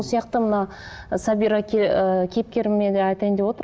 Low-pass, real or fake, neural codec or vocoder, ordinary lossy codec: none; real; none; none